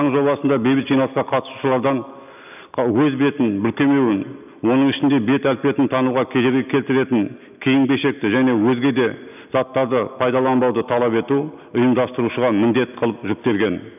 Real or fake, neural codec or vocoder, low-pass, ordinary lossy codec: real; none; 3.6 kHz; none